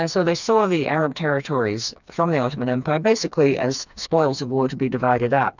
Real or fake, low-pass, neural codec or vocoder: fake; 7.2 kHz; codec, 16 kHz, 2 kbps, FreqCodec, smaller model